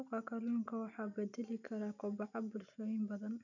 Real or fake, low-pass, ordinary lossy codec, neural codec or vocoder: real; 7.2 kHz; none; none